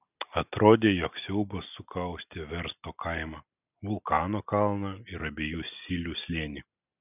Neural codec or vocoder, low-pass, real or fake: none; 3.6 kHz; real